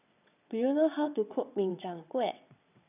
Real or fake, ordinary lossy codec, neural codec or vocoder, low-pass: fake; none; vocoder, 22.05 kHz, 80 mel bands, WaveNeXt; 3.6 kHz